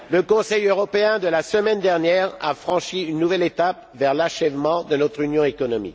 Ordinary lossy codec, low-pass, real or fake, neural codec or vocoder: none; none; real; none